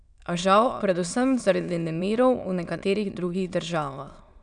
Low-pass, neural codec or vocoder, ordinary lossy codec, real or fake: 9.9 kHz; autoencoder, 22.05 kHz, a latent of 192 numbers a frame, VITS, trained on many speakers; none; fake